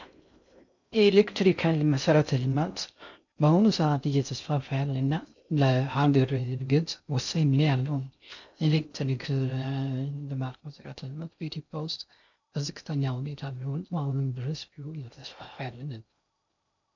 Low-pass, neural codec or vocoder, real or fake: 7.2 kHz; codec, 16 kHz in and 24 kHz out, 0.6 kbps, FocalCodec, streaming, 2048 codes; fake